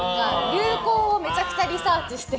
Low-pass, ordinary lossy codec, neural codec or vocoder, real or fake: none; none; none; real